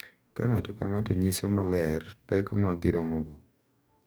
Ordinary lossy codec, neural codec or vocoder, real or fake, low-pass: none; codec, 44.1 kHz, 2.6 kbps, DAC; fake; none